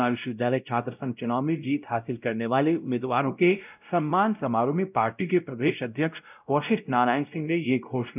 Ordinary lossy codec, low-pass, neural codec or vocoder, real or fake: none; 3.6 kHz; codec, 16 kHz, 0.5 kbps, X-Codec, WavLM features, trained on Multilingual LibriSpeech; fake